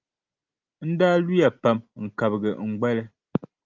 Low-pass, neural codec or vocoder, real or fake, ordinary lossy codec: 7.2 kHz; none; real; Opus, 32 kbps